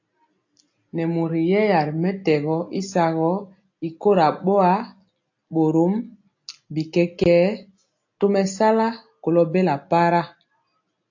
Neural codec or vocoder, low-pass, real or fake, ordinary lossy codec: none; 7.2 kHz; real; AAC, 48 kbps